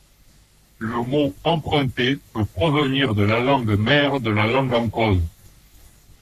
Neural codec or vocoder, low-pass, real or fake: codec, 44.1 kHz, 3.4 kbps, Pupu-Codec; 14.4 kHz; fake